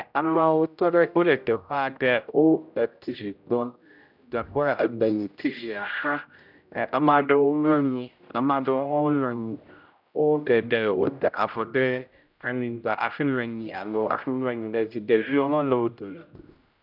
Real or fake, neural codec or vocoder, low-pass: fake; codec, 16 kHz, 0.5 kbps, X-Codec, HuBERT features, trained on general audio; 5.4 kHz